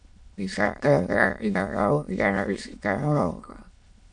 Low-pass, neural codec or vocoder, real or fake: 9.9 kHz; autoencoder, 22.05 kHz, a latent of 192 numbers a frame, VITS, trained on many speakers; fake